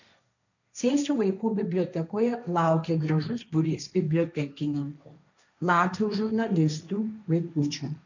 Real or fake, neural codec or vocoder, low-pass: fake; codec, 16 kHz, 1.1 kbps, Voila-Tokenizer; 7.2 kHz